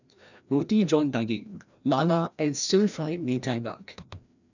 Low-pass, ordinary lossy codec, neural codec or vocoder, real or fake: 7.2 kHz; none; codec, 16 kHz, 1 kbps, FreqCodec, larger model; fake